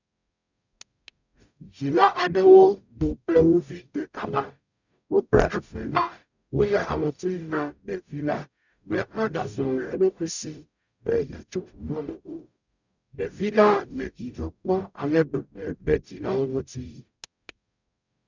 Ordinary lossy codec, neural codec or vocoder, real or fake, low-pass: none; codec, 44.1 kHz, 0.9 kbps, DAC; fake; 7.2 kHz